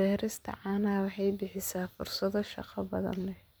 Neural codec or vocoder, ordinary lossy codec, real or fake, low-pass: vocoder, 44.1 kHz, 128 mel bands every 256 samples, BigVGAN v2; none; fake; none